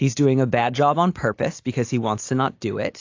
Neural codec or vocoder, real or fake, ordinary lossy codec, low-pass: none; real; AAC, 48 kbps; 7.2 kHz